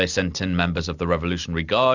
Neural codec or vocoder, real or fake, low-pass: none; real; 7.2 kHz